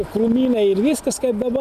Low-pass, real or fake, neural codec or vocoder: 14.4 kHz; real; none